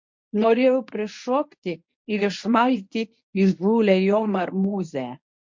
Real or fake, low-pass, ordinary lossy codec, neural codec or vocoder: fake; 7.2 kHz; MP3, 48 kbps; codec, 24 kHz, 0.9 kbps, WavTokenizer, medium speech release version 1